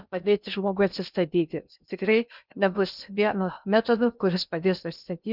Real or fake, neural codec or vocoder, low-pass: fake; codec, 16 kHz in and 24 kHz out, 0.6 kbps, FocalCodec, streaming, 2048 codes; 5.4 kHz